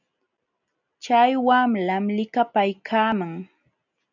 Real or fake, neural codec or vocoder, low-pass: real; none; 7.2 kHz